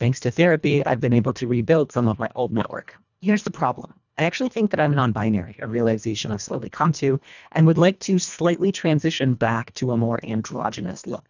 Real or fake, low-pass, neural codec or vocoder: fake; 7.2 kHz; codec, 24 kHz, 1.5 kbps, HILCodec